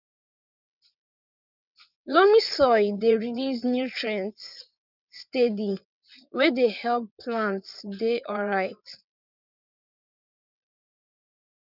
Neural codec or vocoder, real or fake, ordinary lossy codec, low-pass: vocoder, 22.05 kHz, 80 mel bands, WaveNeXt; fake; none; 5.4 kHz